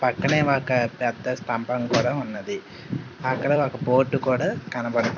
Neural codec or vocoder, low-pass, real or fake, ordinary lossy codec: vocoder, 44.1 kHz, 128 mel bands every 256 samples, BigVGAN v2; 7.2 kHz; fake; none